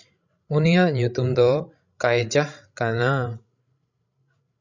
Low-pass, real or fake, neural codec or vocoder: 7.2 kHz; fake; codec, 16 kHz, 16 kbps, FreqCodec, larger model